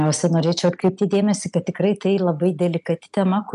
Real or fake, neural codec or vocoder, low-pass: real; none; 10.8 kHz